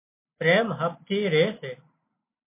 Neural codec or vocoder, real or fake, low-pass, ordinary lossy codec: none; real; 3.6 kHz; MP3, 24 kbps